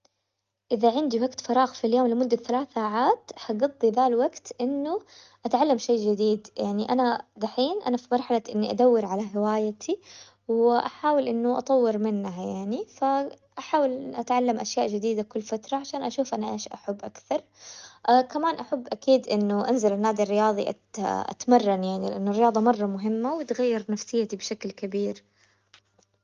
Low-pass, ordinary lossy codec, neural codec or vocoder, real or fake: 7.2 kHz; Opus, 32 kbps; none; real